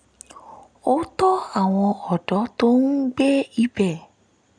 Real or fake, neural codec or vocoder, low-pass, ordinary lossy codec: real; none; 9.9 kHz; none